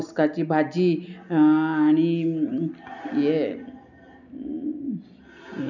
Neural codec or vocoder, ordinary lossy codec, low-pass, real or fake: none; none; 7.2 kHz; real